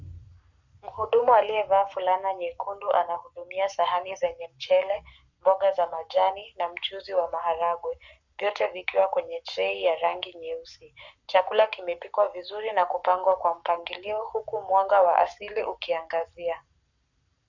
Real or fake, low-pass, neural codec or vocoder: fake; 7.2 kHz; codec, 44.1 kHz, 7.8 kbps, Pupu-Codec